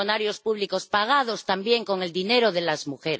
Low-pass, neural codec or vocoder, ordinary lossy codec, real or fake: none; none; none; real